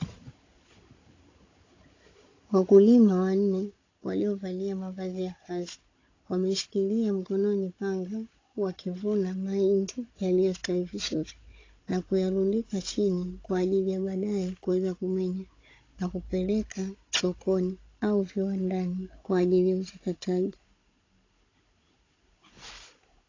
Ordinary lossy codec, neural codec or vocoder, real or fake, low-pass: AAC, 32 kbps; codec, 16 kHz, 16 kbps, FunCodec, trained on Chinese and English, 50 frames a second; fake; 7.2 kHz